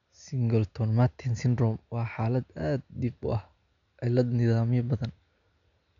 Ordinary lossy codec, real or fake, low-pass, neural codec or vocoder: none; real; 7.2 kHz; none